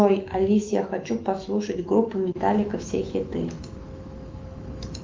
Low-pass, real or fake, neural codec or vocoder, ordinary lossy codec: 7.2 kHz; fake; autoencoder, 48 kHz, 128 numbers a frame, DAC-VAE, trained on Japanese speech; Opus, 24 kbps